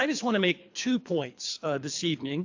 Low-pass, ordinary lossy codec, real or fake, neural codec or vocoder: 7.2 kHz; AAC, 48 kbps; fake; codec, 24 kHz, 3 kbps, HILCodec